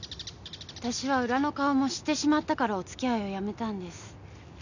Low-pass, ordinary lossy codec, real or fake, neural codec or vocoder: 7.2 kHz; none; real; none